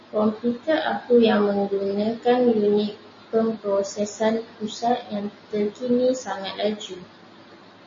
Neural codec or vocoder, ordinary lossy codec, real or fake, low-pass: none; MP3, 32 kbps; real; 7.2 kHz